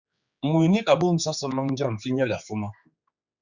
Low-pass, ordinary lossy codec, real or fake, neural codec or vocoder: 7.2 kHz; Opus, 64 kbps; fake; codec, 16 kHz, 4 kbps, X-Codec, HuBERT features, trained on general audio